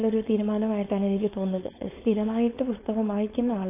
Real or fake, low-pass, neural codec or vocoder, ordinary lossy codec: fake; 3.6 kHz; codec, 16 kHz, 4.8 kbps, FACodec; AAC, 24 kbps